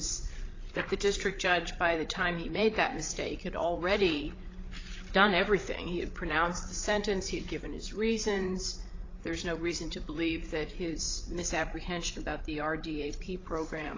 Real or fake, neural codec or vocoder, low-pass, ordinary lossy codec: fake; codec, 16 kHz, 8 kbps, FreqCodec, larger model; 7.2 kHz; AAC, 32 kbps